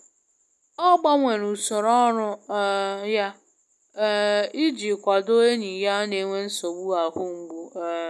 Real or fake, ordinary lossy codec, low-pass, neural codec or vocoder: real; none; none; none